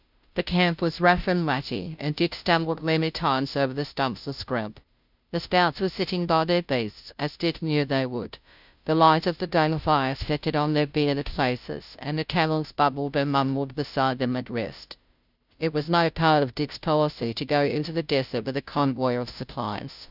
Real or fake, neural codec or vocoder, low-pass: fake; codec, 16 kHz, 0.5 kbps, FunCodec, trained on Chinese and English, 25 frames a second; 5.4 kHz